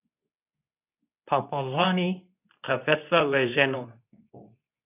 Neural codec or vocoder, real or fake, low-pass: codec, 24 kHz, 0.9 kbps, WavTokenizer, medium speech release version 2; fake; 3.6 kHz